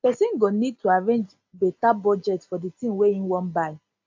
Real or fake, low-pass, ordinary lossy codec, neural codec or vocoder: real; 7.2 kHz; AAC, 48 kbps; none